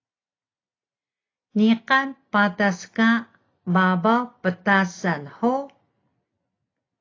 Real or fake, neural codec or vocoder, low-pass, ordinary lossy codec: real; none; 7.2 kHz; AAC, 32 kbps